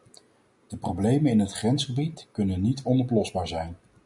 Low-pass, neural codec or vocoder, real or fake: 10.8 kHz; none; real